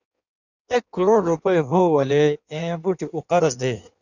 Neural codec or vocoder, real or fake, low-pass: codec, 16 kHz in and 24 kHz out, 1.1 kbps, FireRedTTS-2 codec; fake; 7.2 kHz